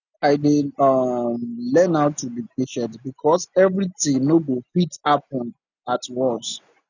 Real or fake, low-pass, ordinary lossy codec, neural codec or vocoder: real; 7.2 kHz; none; none